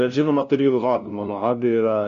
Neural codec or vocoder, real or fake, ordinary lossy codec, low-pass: codec, 16 kHz, 0.5 kbps, FunCodec, trained on LibriTTS, 25 frames a second; fake; MP3, 64 kbps; 7.2 kHz